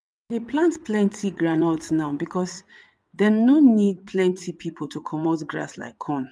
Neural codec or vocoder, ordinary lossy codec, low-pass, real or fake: vocoder, 22.05 kHz, 80 mel bands, WaveNeXt; none; none; fake